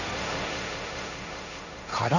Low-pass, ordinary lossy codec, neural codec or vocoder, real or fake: 7.2 kHz; none; codec, 16 kHz, 1.1 kbps, Voila-Tokenizer; fake